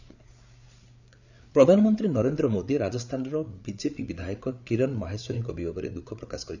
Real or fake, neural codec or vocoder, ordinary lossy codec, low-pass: fake; codec, 16 kHz, 8 kbps, FreqCodec, larger model; none; 7.2 kHz